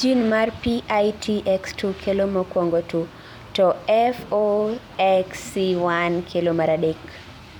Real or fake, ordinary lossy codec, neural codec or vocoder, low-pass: fake; none; vocoder, 44.1 kHz, 128 mel bands every 256 samples, BigVGAN v2; 19.8 kHz